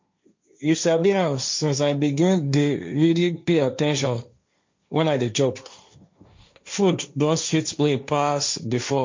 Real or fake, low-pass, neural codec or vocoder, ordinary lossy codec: fake; 7.2 kHz; codec, 16 kHz, 1.1 kbps, Voila-Tokenizer; MP3, 48 kbps